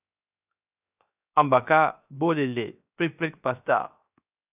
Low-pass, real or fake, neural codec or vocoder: 3.6 kHz; fake; codec, 16 kHz, 0.7 kbps, FocalCodec